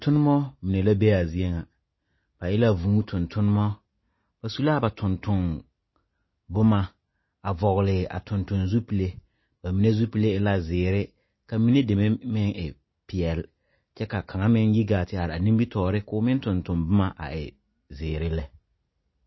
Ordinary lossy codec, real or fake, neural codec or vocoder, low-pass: MP3, 24 kbps; real; none; 7.2 kHz